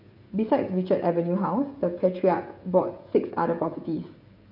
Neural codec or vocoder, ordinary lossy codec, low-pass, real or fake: codec, 16 kHz, 16 kbps, FreqCodec, smaller model; none; 5.4 kHz; fake